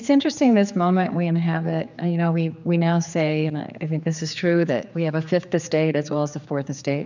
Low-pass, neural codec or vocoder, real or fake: 7.2 kHz; codec, 16 kHz, 4 kbps, X-Codec, HuBERT features, trained on general audio; fake